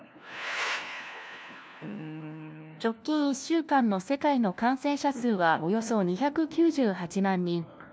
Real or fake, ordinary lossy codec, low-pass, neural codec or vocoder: fake; none; none; codec, 16 kHz, 1 kbps, FunCodec, trained on LibriTTS, 50 frames a second